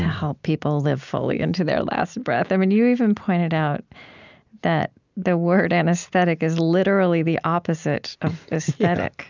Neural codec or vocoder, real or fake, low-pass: none; real; 7.2 kHz